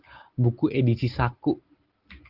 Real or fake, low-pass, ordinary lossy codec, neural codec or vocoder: real; 5.4 kHz; Opus, 16 kbps; none